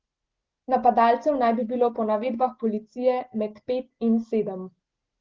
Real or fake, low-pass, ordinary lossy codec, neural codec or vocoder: real; 7.2 kHz; Opus, 16 kbps; none